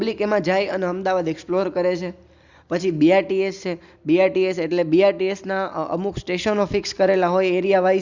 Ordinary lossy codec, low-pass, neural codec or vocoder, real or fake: Opus, 64 kbps; 7.2 kHz; none; real